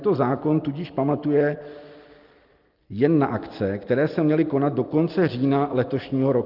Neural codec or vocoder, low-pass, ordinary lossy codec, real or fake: none; 5.4 kHz; Opus, 32 kbps; real